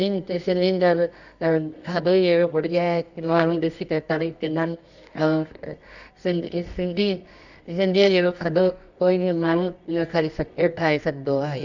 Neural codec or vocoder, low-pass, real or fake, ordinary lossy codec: codec, 24 kHz, 0.9 kbps, WavTokenizer, medium music audio release; 7.2 kHz; fake; none